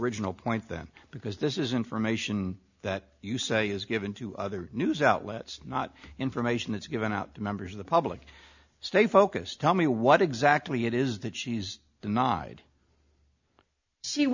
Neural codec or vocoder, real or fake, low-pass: none; real; 7.2 kHz